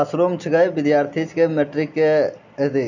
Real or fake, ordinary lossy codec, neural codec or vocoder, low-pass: real; none; none; 7.2 kHz